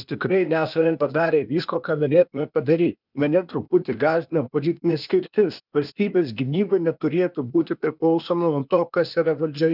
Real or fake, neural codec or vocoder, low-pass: fake; codec, 16 kHz, 0.8 kbps, ZipCodec; 5.4 kHz